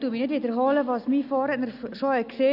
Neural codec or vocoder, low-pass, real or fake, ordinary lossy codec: none; 5.4 kHz; real; none